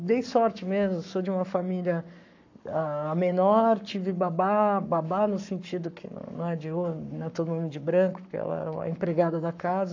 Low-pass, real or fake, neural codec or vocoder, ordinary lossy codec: 7.2 kHz; fake; codec, 44.1 kHz, 7.8 kbps, Pupu-Codec; AAC, 48 kbps